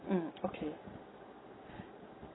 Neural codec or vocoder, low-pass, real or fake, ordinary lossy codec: none; 7.2 kHz; real; AAC, 16 kbps